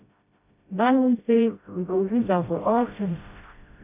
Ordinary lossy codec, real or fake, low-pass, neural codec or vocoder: AAC, 32 kbps; fake; 3.6 kHz; codec, 16 kHz, 0.5 kbps, FreqCodec, smaller model